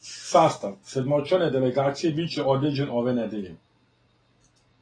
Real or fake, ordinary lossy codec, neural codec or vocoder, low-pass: real; AAC, 32 kbps; none; 9.9 kHz